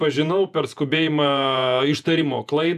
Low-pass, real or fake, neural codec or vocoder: 14.4 kHz; fake; vocoder, 48 kHz, 128 mel bands, Vocos